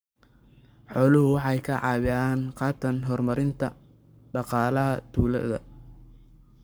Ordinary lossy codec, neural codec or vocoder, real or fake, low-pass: none; codec, 44.1 kHz, 7.8 kbps, Pupu-Codec; fake; none